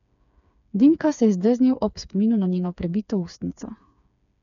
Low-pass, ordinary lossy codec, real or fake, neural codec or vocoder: 7.2 kHz; none; fake; codec, 16 kHz, 4 kbps, FreqCodec, smaller model